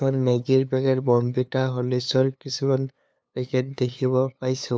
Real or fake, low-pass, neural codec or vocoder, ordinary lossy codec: fake; none; codec, 16 kHz, 2 kbps, FunCodec, trained on LibriTTS, 25 frames a second; none